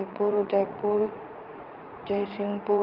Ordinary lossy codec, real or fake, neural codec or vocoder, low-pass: Opus, 24 kbps; fake; vocoder, 44.1 kHz, 80 mel bands, Vocos; 5.4 kHz